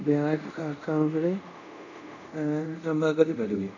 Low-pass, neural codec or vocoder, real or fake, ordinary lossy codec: 7.2 kHz; codec, 24 kHz, 0.5 kbps, DualCodec; fake; none